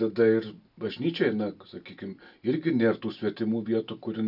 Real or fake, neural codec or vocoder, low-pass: real; none; 5.4 kHz